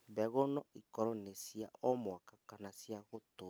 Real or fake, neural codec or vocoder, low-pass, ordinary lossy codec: real; none; none; none